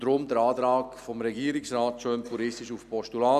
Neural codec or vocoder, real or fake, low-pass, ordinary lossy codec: none; real; 14.4 kHz; none